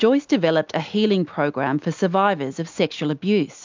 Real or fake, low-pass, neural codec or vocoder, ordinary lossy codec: real; 7.2 kHz; none; MP3, 64 kbps